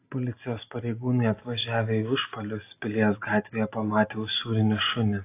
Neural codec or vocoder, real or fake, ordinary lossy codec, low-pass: none; real; AAC, 24 kbps; 3.6 kHz